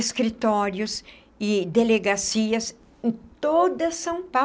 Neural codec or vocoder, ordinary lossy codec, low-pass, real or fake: none; none; none; real